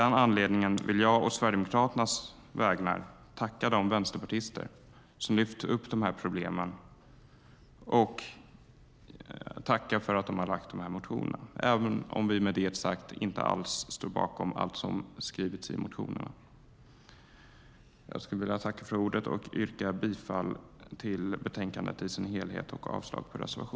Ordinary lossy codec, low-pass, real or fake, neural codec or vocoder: none; none; real; none